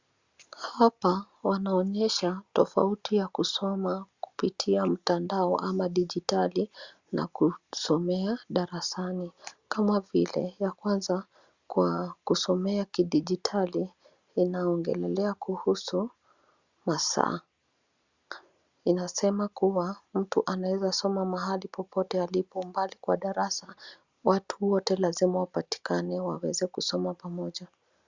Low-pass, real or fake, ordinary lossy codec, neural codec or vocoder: 7.2 kHz; real; Opus, 64 kbps; none